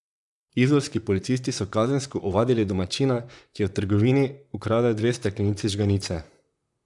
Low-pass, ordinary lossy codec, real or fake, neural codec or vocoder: 10.8 kHz; none; fake; vocoder, 44.1 kHz, 128 mel bands, Pupu-Vocoder